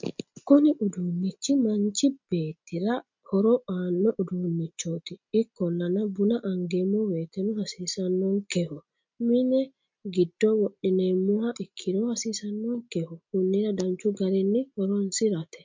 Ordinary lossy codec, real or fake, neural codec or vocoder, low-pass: MP3, 64 kbps; real; none; 7.2 kHz